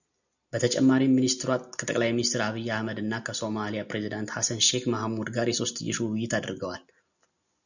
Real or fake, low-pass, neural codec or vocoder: real; 7.2 kHz; none